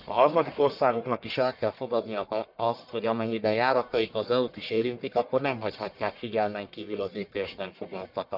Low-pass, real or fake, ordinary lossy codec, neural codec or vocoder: 5.4 kHz; fake; none; codec, 44.1 kHz, 1.7 kbps, Pupu-Codec